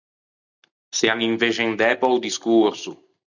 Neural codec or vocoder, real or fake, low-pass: none; real; 7.2 kHz